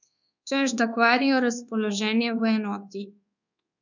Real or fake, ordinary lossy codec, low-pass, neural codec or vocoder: fake; none; 7.2 kHz; codec, 24 kHz, 1.2 kbps, DualCodec